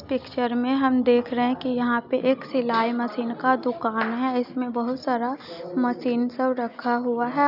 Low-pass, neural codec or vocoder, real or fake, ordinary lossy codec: 5.4 kHz; none; real; none